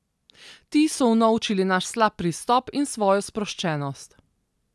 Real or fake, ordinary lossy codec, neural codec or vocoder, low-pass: real; none; none; none